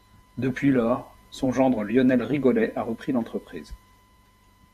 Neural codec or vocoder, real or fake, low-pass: vocoder, 48 kHz, 128 mel bands, Vocos; fake; 14.4 kHz